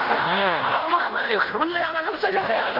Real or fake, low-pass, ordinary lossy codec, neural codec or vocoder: fake; 5.4 kHz; none; codec, 16 kHz in and 24 kHz out, 0.9 kbps, LongCat-Audio-Codec, fine tuned four codebook decoder